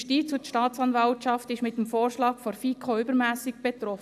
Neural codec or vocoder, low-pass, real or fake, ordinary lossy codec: none; 14.4 kHz; real; AAC, 96 kbps